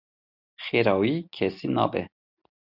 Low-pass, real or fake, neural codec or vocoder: 5.4 kHz; real; none